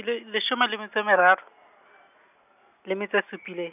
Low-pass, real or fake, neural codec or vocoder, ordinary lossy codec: 3.6 kHz; real; none; none